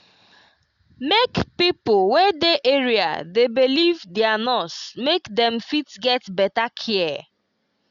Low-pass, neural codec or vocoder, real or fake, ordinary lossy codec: 7.2 kHz; none; real; none